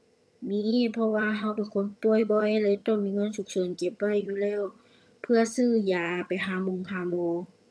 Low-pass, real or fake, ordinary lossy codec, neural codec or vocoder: none; fake; none; vocoder, 22.05 kHz, 80 mel bands, HiFi-GAN